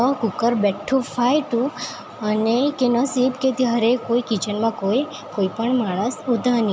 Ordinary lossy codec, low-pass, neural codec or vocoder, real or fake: none; none; none; real